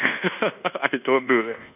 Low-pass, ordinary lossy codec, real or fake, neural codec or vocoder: 3.6 kHz; none; fake; codec, 24 kHz, 1.2 kbps, DualCodec